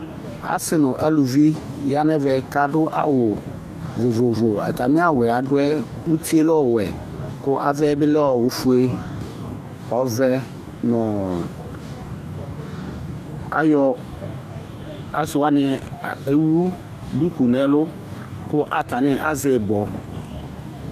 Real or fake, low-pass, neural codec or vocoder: fake; 14.4 kHz; codec, 44.1 kHz, 2.6 kbps, DAC